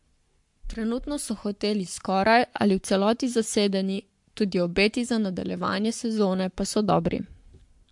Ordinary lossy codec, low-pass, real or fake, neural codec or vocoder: MP3, 64 kbps; 10.8 kHz; fake; codec, 44.1 kHz, 7.8 kbps, Pupu-Codec